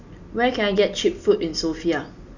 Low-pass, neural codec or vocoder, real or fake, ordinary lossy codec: 7.2 kHz; none; real; none